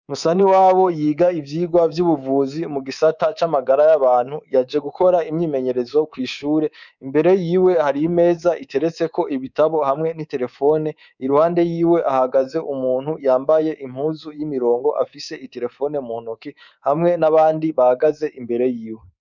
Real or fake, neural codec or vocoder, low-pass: fake; codec, 24 kHz, 3.1 kbps, DualCodec; 7.2 kHz